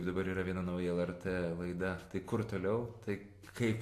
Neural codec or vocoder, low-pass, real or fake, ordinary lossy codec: none; 14.4 kHz; real; AAC, 64 kbps